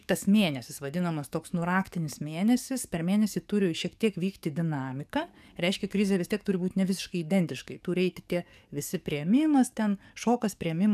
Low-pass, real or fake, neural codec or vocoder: 14.4 kHz; fake; codec, 44.1 kHz, 7.8 kbps, DAC